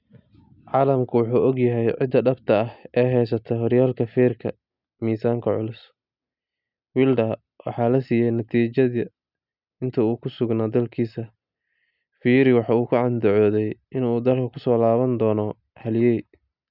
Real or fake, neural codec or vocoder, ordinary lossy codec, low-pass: real; none; none; 5.4 kHz